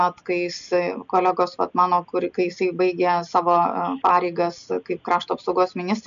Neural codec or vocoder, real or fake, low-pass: none; real; 7.2 kHz